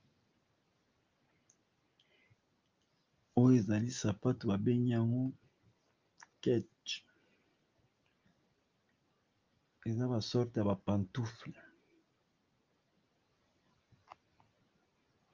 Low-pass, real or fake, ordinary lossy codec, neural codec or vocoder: 7.2 kHz; real; Opus, 32 kbps; none